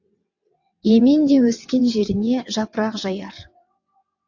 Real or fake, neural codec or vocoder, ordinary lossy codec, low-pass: fake; vocoder, 22.05 kHz, 80 mel bands, WaveNeXt; AAC, 48 kbps; 7.2 kHz